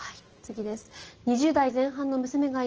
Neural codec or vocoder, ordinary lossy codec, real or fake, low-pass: none; Opus, 16 kbps; real; 7.2 kHz